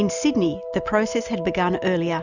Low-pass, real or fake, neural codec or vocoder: 7.2 kHz; real; none